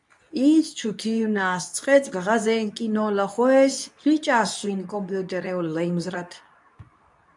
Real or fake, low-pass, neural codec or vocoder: fake; 10.8 kHz; codec, 24 kHz, 0.9 kbps, WavTokenizer, medium speech release version 2